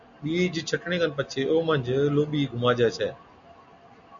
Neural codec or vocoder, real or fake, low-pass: none; real; 7.2 kHz